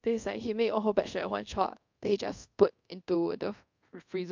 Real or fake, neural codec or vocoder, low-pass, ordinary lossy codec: fake; codec, 24 kHz, 0.5 kbps, DualCodec; 7.2 kHz; MP3, 64 kbps